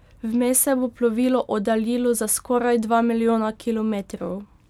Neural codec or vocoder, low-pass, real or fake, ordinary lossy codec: none; 19.8 kHz; real; none